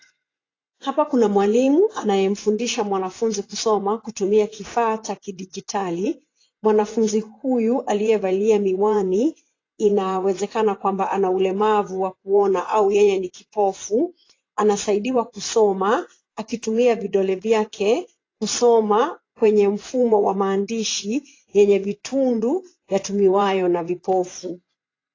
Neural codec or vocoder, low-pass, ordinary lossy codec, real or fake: vocoder, 24 kHz, 100 mel bands, Vocos; 7.2 kHz; AAC, 32 kbps; fake